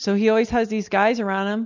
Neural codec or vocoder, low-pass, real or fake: none; 7.2 kHz; real